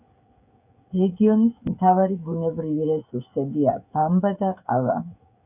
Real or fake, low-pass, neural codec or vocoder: fake; 3.6 kHz; codec, 16 kHz, 16 kbps, FreqCodec, smaller model